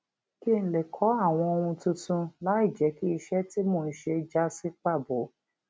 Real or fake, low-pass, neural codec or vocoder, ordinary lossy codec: real; none; none; none